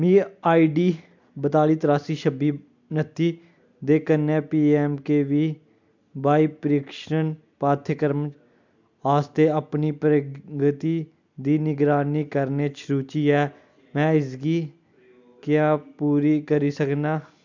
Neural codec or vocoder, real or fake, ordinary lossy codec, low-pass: none; real; MP3, 64 kbps; 7.2 kHz